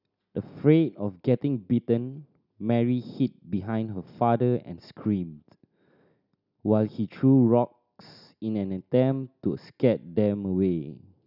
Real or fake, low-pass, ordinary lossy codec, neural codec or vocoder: real; 5.4 kHz; none; none